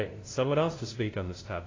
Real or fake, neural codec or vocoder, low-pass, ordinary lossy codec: fake; codec, 16 kHz, 0.5 kbps, FunCodec, trained on LibriTTS, 25 frames a second; 7.2 kHz; AAC, 32 kbps